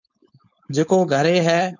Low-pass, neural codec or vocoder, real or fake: 7.2 kHz; codec, 16 kHz, 4.8 kbps, FACodec; fake